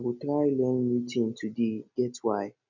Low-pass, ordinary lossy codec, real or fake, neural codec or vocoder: 7.2 kHz; none; real; none